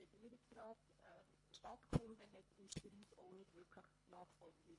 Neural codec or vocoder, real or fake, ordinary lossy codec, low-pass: codec, 24 kHz, 1.5 kbps, HILCodec; fake; MP3, 48 kbps; 10.8 kHz